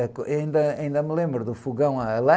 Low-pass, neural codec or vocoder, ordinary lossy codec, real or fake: none; none; none; real